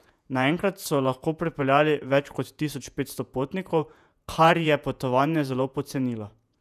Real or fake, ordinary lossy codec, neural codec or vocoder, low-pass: fake; none; vocoder, 48 kHz, 128 mel bands, Vocos; 14.4 kHz